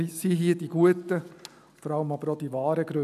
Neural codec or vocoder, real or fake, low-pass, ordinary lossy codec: none; real; 14.4 kHz; none